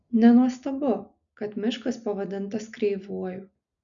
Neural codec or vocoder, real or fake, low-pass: none; real; 7.2 kHz